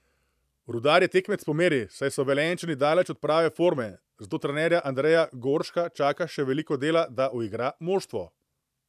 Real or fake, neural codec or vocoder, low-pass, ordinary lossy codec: fake; vocoder, 44.1 kHz, 128 mel bands every 512 samples, BigVGAN v2; 14.4 kHz; none